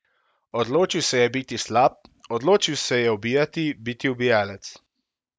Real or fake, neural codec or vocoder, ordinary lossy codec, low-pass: real; none; none; none